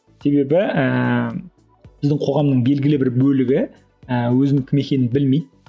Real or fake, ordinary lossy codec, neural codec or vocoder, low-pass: real; none; none; none